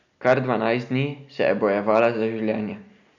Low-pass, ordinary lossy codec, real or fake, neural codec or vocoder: 7.2 kHz; none; real; none